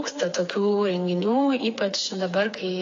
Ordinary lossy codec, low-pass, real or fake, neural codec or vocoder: AAC, 32 kbps; 7.2 kHz; fake; codec, 16 kHz, 4 kbps, FreqCodec, smaller model